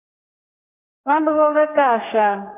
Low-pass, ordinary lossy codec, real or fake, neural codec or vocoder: 3.6 kHz; AAC, 32 kbps; fake; codec, 32 kHz, 1.9 kbps, SNAC